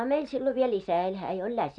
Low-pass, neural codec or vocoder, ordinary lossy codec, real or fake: 10.8 kHz; none; none; real